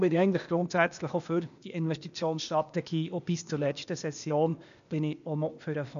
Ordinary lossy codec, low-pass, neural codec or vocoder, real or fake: none; 7.2 kHz; codec, 16 kHz, 0.8 kbps, ZipCodec; fake